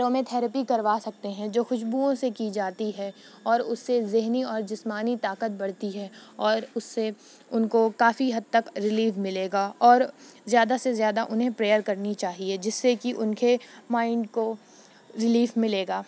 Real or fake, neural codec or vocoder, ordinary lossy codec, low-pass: real; none; none; none